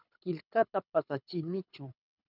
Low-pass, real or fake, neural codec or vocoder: 5.4 kHz; fake; codec, 24 kHz, 6 kbps, HILCodec